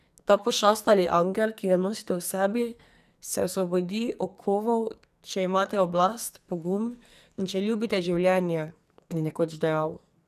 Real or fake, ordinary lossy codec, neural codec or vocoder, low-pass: fake; none; codec, 44.1 kHz, 2.6 kbps, SNAC; 14.4 kHz